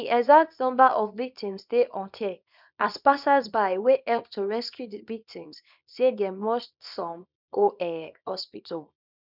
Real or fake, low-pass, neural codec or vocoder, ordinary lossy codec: fake; 5.4 kHz; codec, 24 kHz, 0.9 kbps, WavTokenizer, small release; none